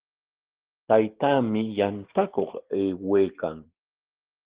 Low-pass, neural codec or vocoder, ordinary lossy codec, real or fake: 3.6 kHz; none; Opus, 16 kbps; real